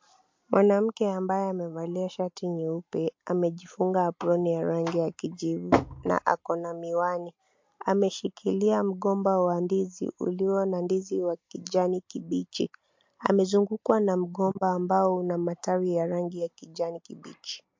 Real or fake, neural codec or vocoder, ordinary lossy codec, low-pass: real; none; MP3, 48 kbps; 7.2 kHz